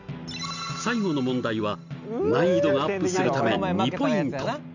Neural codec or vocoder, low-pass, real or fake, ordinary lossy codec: none; 7.2 kHz; real; none